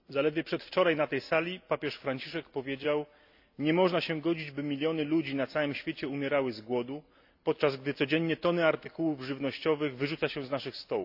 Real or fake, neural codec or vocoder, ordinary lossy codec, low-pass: real; none; AAC, 48 kbps; 5.4 kHz